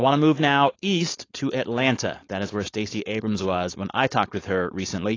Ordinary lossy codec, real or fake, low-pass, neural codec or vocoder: AAC, 32 kbps; fake; 7.2 kHz; codec, 16 kHz, 4.8 kbps, FACodec